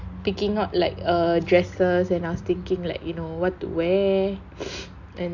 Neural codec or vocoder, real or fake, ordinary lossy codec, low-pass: none; real; none; 7.2 kHz